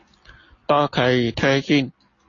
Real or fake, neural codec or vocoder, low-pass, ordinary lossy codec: real; none; 7.2 kHz; AAC, 32 kbps